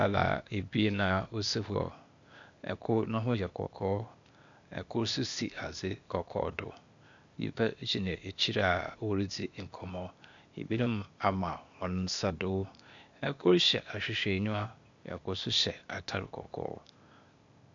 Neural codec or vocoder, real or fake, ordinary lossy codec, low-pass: codec, 16 kHz, 0.8 kbps, ZipCodec; fake; AAC, 64 kbps; 7.2 kHz